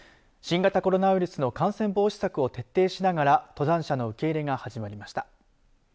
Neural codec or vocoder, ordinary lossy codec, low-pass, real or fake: none; none; none; real